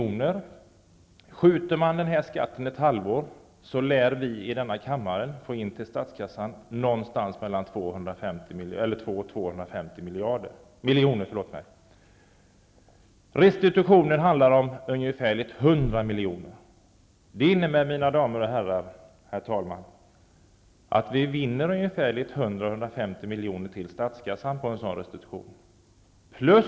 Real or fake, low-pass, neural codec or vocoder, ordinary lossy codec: real; none; none; none